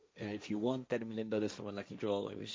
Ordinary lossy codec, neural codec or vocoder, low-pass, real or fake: none; codec, 16 kHz, 1.1 kbps, Voila-Tokenizer; none; fake